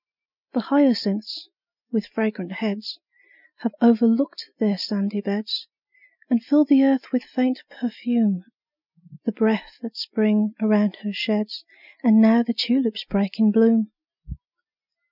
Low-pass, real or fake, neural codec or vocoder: 5.4 kHz; real; none